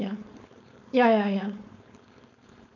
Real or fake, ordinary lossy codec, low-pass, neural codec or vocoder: fake; none; 7.2 kHz; codec, 16 kHz, 4.8 kbps, FACodec